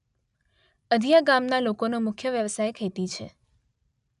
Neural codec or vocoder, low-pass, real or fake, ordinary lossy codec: none; 10.8 kHz; real; none